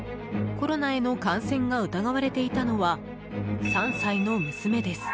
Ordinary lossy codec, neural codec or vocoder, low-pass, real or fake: none; none; none; real